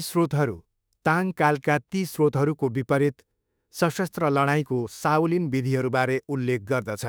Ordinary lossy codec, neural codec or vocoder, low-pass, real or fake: none; autoencoder, 48 kHz, 32 numbers a frame, DAC-VAE, trained on Japanese speech; none; fake